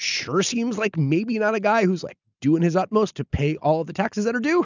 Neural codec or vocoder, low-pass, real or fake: none; 7.2 kHz; real